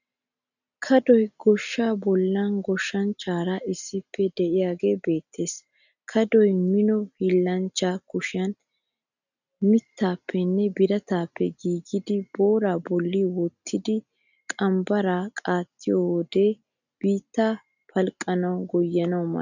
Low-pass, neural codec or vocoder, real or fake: 7.2 kHz; none; real